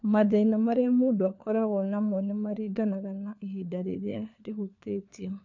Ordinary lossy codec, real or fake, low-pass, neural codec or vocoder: MP3, 64 kbps; fake; 7.2 kHz; codec, 16 kHz, 4 kbps, FunCodec, trained on LibriTTS, 50 frames a second